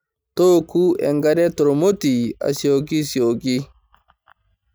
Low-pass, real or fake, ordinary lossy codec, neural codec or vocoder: none; real; none; none